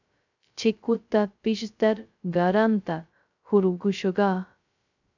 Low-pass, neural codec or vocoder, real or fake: 7.2 kHz; codec, 16 kHz, 0.2 kbps, FocalCodec; fake